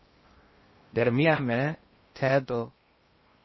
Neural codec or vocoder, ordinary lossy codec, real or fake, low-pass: codec, 16 kHz in and 24 kHz out, 0.6 kbps, FocalCodec, streaming, 2048 codes; MP3, 24 kbps; fake; 7.2 kHz